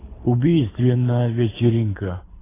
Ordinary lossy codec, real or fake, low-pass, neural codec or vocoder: AAC, 16 kbps; fake; 3.6 kHz; codec, 24 kHz, 6 kbps, HILCodec